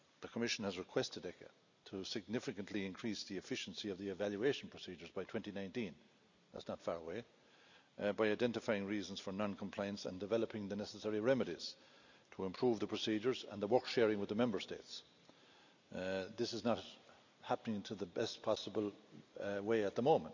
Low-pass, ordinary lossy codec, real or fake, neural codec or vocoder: 7.2 kHz; none; real; none